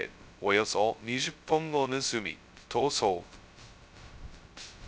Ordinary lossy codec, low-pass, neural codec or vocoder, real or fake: none; none; codec, 16 kHz, 0.2 kbps, FocalCodec; fake